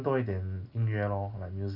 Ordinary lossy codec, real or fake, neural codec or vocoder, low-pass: none; real; none; 5.4 kHz